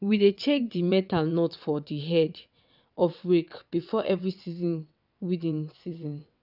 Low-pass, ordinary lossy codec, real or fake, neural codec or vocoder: 5.4 kHz; none; fake; vocoder, 22.05 kHz, 80 mel bands, WaveNeXt